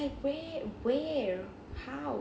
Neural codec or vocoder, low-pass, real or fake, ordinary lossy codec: none; none; real; none